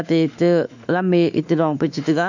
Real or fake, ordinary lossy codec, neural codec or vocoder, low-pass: fake; none; autoencoder, 48 kHz, 32 numbers a frame, DAC-VAE, trained on Japanese speech; 7.2 kHz